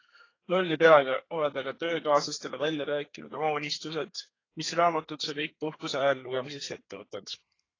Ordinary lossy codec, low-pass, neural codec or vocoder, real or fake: AAC, 32 kbps; 7.2 kHz; codec, 32 kHz, 1.9 kbps, SNAC; fake